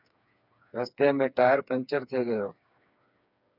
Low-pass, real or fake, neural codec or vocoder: 5.4 kHz; fake; codec, 16 kHz, 4 kbps, FreqCodec, smaller model